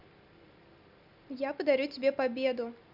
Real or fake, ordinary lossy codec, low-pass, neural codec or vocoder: real; none; 5.4 kHz; none